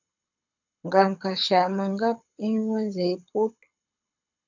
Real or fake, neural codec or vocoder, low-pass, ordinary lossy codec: fake; codec, 24 kHz, 6 kbps, HILCodec; 7.2 kHz; MP3, 64 kbps